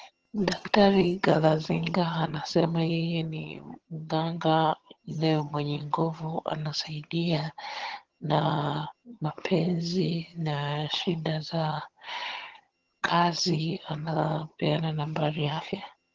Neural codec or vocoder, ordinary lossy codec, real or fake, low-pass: vocoder, 22.05 kHz, 80 mel bands, HiFi-GAN; Opus, 16 kbps; fake; 7.2 kHz